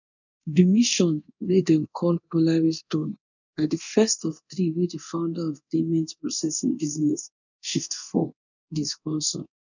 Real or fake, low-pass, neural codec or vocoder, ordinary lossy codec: fake; 7.2 kHz; codec, 24 kHz, 0.9 kbps, DualCodec; none